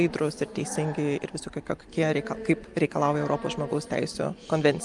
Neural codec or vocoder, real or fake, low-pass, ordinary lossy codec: none; real; 10.8 kHz; Opus, 32 kbps